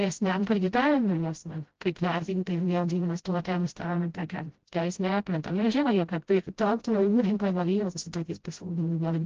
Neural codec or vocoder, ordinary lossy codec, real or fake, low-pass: codec, 16 kHz, 0.5 kbps, FreqCodec, smaller model; Opus, 16 kbps; fake; 7.2 kHz